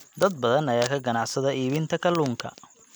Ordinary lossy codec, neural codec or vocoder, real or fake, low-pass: none; none; real; none